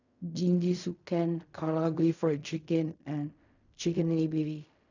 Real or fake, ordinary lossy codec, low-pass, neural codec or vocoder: fake; none; 7.2 kHz; codec, 16 kHz in and 24 kHz out, 0.4 kbps, LongCat-Audio-Codec, fine tuned four codebook decoder